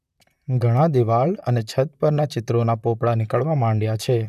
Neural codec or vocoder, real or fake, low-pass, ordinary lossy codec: vocoder, 44.1 kHz, 128 mel bands, Pupu-Vocoder; fake; 14.4 kHz; none